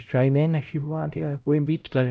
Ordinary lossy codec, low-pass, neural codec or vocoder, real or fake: none; none; codec, 16 kHz, 0.5 kbps, X-Codec, HuBERT features, trained on LibriSpeech; fake